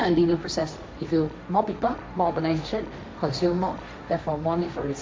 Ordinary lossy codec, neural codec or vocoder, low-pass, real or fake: none; codec, 16 kHz, 1.1 kbps, Voila-Tokenizer; none; fake